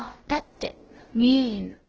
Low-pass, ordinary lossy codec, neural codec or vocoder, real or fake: 7.2 kHz; Opus, 16 kbps; codec, 16 kHz, about 1 kbps, DyCAST, with the encoder's durations; fake